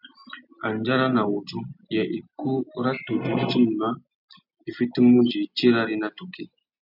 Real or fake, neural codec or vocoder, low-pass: real; none; 5.4 kHz